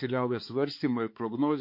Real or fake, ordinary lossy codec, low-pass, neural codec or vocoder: fake; MP3, 32 kbps; 5.4 kHz; codec, 16 kHz, 4 kbps, X-Codec, HuBERT features, trained on balanced general audio